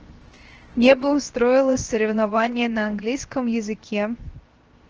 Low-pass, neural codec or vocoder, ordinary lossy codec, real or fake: 7.2 kHz; codec, 16 kHz, 0.7 kbps, FocalCodec; Opus, 16 kbps; fake